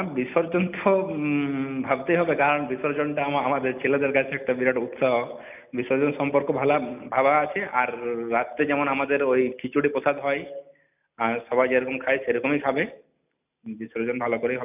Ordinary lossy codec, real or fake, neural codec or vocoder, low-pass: none; real; none; 3.6 kHz